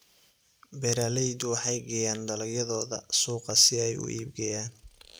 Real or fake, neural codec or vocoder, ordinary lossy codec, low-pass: real; none; none; none